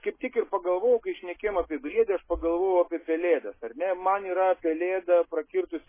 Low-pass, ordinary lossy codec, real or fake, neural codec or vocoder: 3.6 kHz; MP3, 16 kbps; real; none